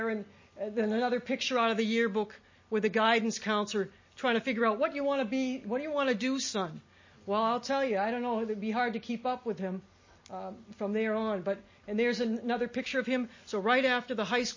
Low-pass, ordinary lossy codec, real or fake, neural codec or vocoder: 7.2 kHz; MP3, 32 kbps; real; none